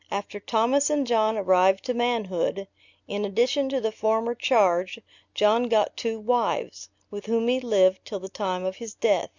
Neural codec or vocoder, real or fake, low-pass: none; real; 7.2 kHz